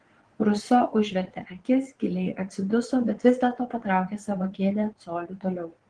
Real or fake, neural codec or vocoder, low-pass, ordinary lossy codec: fake; vocoder, 22.05 kHz, 80 mel bands, Vocos; 9.9 kHz; Opus, 16 kbps